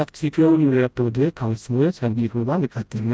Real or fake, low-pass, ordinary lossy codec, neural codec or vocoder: fake; none; none; codec, 16 kHz, 0.5 kbps, FreqCodec, smaller model